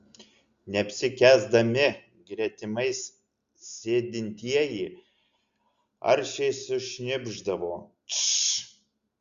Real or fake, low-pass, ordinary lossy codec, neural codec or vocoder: real; 7.2 kHz; Opus, 64 kbps; none